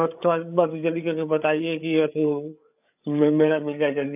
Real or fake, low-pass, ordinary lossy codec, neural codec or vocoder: fake; 3.6 kHz; AAC, 32 kbps; codec, 16 kHz, 8 kbps, FreqCodec, larger model